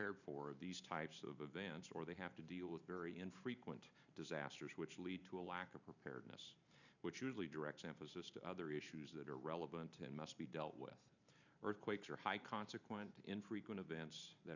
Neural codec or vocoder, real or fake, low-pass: vocoder, 44.1 kHz, 128 mel bands every 512 samples, BigVGAN v2; fake; 7.2 kHz